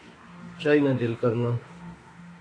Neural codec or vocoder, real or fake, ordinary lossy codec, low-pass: autoencoder, 48 kHz, 32 numbers a frame, DAC-VAE, trained on Japanese speech; fake; AAC, 32 kbps; 9.9 kHz